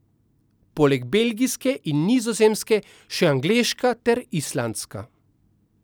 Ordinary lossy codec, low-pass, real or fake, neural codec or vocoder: none; none; real; none